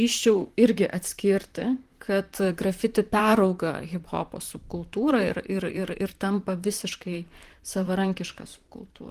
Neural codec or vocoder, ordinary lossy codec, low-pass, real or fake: vocoder, 44.1 kHz, 128 mel bands, Pupu-Vocoder; Opus, 24 kbps; 14.4 kHz; fake